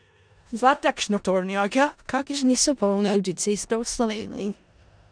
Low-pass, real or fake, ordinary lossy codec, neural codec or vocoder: 9.9 kHz; fake; none; codec, 16 kHz in and 24 kHz out, 0.4 kbps, LongCat-Audio-Codec, four codebook decoder